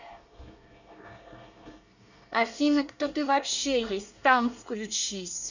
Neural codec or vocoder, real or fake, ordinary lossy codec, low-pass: codec, 24 kHz, 1 kbps, SNAC; fake; none; 7.2 kHz